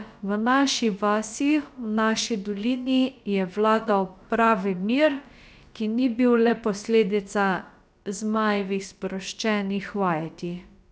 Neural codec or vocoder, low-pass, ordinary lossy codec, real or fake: codec, 16 kHz, about 1 kbps, DyCAST, with the encoder's durations; none; none; fake